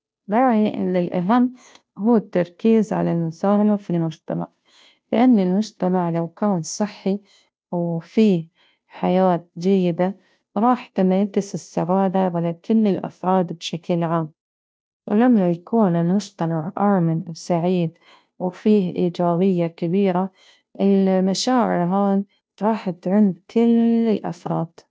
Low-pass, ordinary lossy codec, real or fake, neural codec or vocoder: none; none; fake; codec, 16 kHz, 0.5 kbps, FunCodec, trained on Chinese and English, 25 frames a second